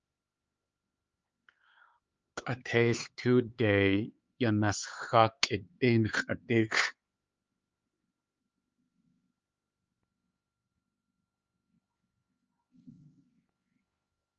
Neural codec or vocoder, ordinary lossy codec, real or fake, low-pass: codec, 16 kHz, 4 kbps, X-Codec, HuBERT features, trained on LibriSpeech; Opus, 24 kbps; fake; 7.2 kHz